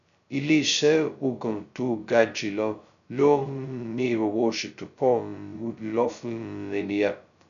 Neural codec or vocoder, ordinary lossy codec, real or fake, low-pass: codec, 16 kHz, 0.2 kbps, FocalCodec; none; fake; 7.2 kHz